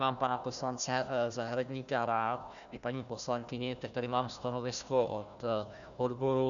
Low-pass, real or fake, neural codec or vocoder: 7.2 kHz; fake; codec, 16 kHz, 1 kbps, FunCodec, trained on Chinese and English, 50 frames a second